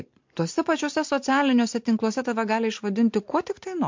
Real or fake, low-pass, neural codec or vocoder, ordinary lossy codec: real; 7.2 kHz; none; MP3, 48 kbps